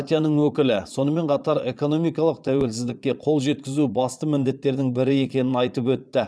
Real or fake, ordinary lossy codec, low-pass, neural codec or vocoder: fake; none; none; vocoder, 22.05 kHz, 80 mel bands, Vocos